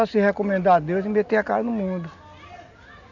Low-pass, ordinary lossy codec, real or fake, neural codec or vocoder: 7.2 kHz; none; real; none